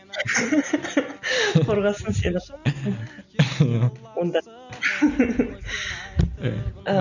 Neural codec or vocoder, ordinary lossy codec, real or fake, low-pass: none; none; real; 7.2 kHz